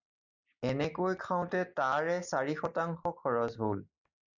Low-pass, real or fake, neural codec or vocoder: 7.2 kHz; real; none